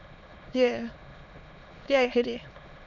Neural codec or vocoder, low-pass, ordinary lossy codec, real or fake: autoencoder, 22.05 kHz, a latent of 192 numbers a frame, VITS, trained on many speakers; 7.2 kHz; none; fake